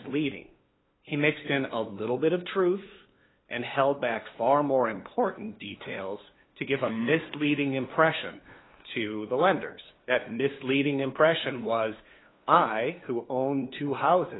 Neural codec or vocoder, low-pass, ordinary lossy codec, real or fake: codec, 16 kHz, 2 kbps, FunCodec, trained on LibriTTS, 25 frames a second; 7.2 kHz; AAC, 16 kbps; fake